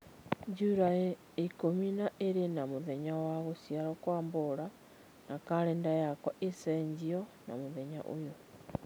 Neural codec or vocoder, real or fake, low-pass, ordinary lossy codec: none; real; none; none